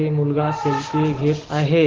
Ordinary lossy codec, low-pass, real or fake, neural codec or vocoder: Opus, 16 kbps; 7.2 kHz; real; none